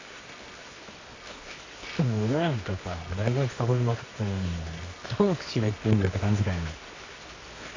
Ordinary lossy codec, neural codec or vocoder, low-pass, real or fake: AAC, 32 kbps; codec, 24 kHz, 0.9 kbps, WavTokenizer, medium music audio release; 7.2 kHz; fake